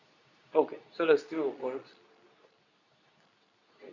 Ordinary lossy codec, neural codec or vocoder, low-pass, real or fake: none; codec, 24 kHz, 0.9 kbps, WavTokenizer, medium speech release version 2; 7.2 kHz; fake